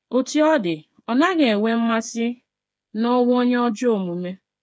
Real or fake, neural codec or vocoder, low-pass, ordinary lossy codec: fake; codec, 16 kHz, 8 kbps, FreqCodec, smaller model; none; none